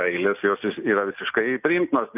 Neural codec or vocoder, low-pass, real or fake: none; 3.6 kHz; real